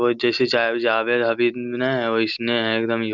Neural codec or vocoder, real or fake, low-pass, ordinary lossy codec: none; real; 7.2 kHz; Opus, 64 kbps